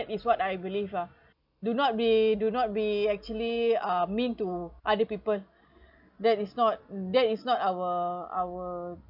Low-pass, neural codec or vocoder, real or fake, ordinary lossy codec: 5.4 kHz; none; real; none